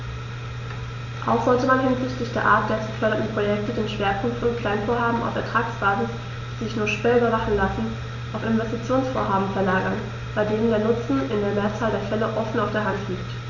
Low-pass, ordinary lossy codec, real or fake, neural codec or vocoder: 7.2 kHz; none; real; none